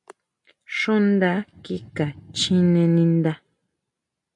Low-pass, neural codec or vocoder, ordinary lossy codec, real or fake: 10.8 kHz; none; MP3, 64 kbps; real